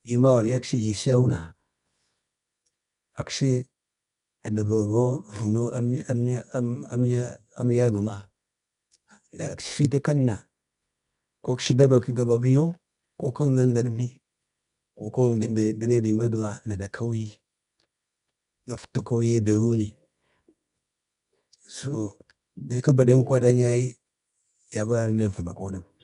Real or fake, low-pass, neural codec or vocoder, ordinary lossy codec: fake; 10.8 kHz; codec, 24 kHz, 0.9 kbps, WavTokenizer, medium music audio release; none